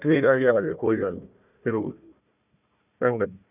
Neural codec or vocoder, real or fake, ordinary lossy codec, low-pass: codec, 16 kHz, 1 kbps, FunCodec, trained on Chinese and English, 50 frames a second; fake; none; 3.6 kHz